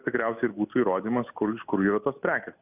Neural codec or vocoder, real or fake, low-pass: none; real; 3.6 kHz